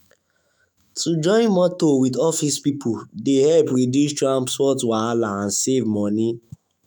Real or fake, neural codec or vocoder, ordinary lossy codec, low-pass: fake; autoencoder, 48 kHz, 128 numbers a frame, DAC-VAE, trained on Japanese speech; none; none